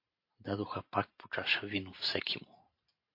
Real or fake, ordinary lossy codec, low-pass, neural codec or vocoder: fake; MP3, 32 kbps; 5.4 kHz; vocoder, 44.1 kHz, 128 mel bands every 512 samples, BigVGAN v2